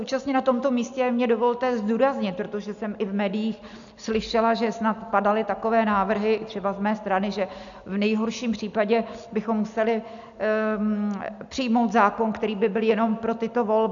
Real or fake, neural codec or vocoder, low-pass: real; none; 7.2 kHz